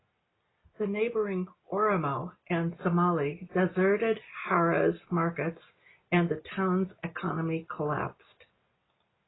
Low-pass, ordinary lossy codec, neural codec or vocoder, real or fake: 7.2 kHz; AAC, 16 kbps; none; real